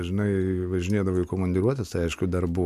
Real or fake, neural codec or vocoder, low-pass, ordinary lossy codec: real; none; 14.4 kHz; MP3, 64 kbps